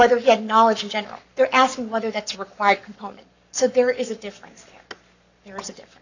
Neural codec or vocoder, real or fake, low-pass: codec, 44.1 kHz, 7.8 kbps, Pupu-Codec; fake; 7.2 kHz